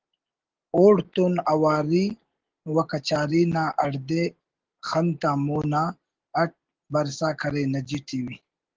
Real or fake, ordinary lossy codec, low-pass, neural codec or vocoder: real; Opus, 16 kbps; 7.2 kHz; none